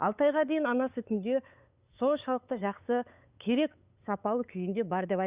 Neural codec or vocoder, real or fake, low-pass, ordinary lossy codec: codec, 16 kHz, 8 kbps, FunCodec, trained on LibriTTS, 25 frames a second; fake; 3.6 kHz; Opus, 64 kbps